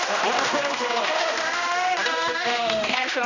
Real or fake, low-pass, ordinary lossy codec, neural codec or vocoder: fake; 7.2 kHz; none; codec, 16 kHz, 1 kbps, X-Codec, HuBERT features, trained on general audio